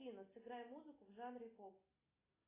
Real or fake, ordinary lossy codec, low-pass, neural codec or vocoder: real; AAC, 16 kbps; 3.6 kHz; none